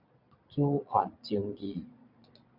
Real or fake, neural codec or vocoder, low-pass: fake; vocoder, 22.05 kHz, 80 mel bands, Vocos; 5.4 kHz